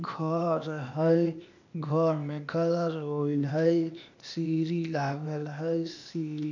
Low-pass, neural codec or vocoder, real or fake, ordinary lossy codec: 7.2 kHz; codec, 16 kHz, 0.8 kbps, ZipCodec; fake; none